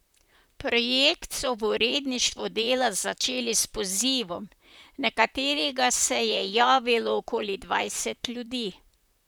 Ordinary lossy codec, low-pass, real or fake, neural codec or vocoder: none; none; fake; vocoder, 44.1 kHz, 128 mel bands, Pupu-Vocoder